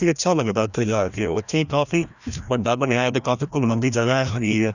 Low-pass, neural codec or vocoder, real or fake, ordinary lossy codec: 7.2 kHz; codec, 16 kHz, 1 kbps, FreqCodec, larger model; fake; none